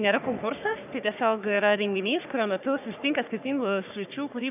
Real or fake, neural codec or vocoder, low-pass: fake; codec, 44.1 kHz, 3.4 kbps, Pupu-Codec; 3.6 kHz